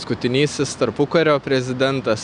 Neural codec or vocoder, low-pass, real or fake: none; 9.9 kHz; real